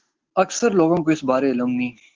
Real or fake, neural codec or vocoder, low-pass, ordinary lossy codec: real; none; 7.2 kHz; Opus, 16 kbps